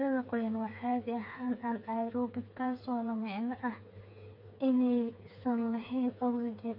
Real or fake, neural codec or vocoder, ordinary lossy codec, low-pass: fake; codec, 16 kHz, 4 kbps, FreqCodec, smaller model; MP3, 32 kbps; 5.4 kHz